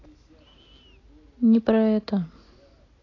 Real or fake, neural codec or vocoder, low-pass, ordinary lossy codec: real; none; 7.2 kHz; AAC, 32 kbps